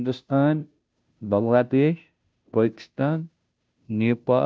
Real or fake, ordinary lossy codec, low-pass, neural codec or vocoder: fake; none; none; codec, 16 kHz, 0.5 kbps, FunCodec, trained on Chinese and English, 25 frames a second